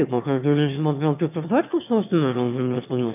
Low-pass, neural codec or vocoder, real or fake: 3.6 kHz; autoencoder, 22.05 kHz, a latent of 192 numbers a frame, VITS, trained on one speaker; fake